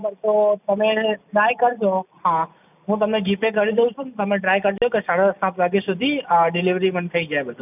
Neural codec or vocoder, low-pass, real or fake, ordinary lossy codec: none; 3.6 kHz; real; none